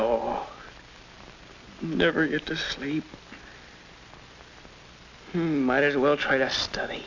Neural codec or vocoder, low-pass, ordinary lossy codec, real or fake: none; 7.2 kHz; AAC, 48 kbps; real